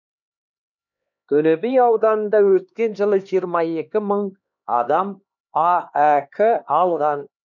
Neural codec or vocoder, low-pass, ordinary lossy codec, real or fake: codec, 16 kHz, 2 kbps, X-Codec, HuBERT features, trained on LibriSpeech; 7.2 kHz; none; fake